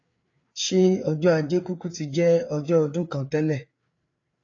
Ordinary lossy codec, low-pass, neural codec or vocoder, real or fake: AAC, 32 kbps; 7.2 kHz; codec, 16 kHz, 4 kbps, FreqCodec, larger model; fake